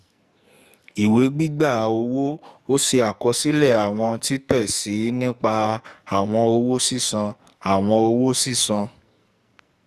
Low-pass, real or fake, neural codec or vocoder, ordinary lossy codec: 14.4 kHz; fake; codec, 44.1 kHz, 2.6 kbps, SNAC; Opus, 64 kbps